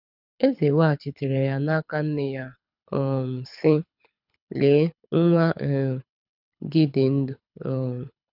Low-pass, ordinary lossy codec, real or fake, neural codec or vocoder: 5.4 kHz; none; fake; codec, 24 kHz, 6 kbps, HILCodec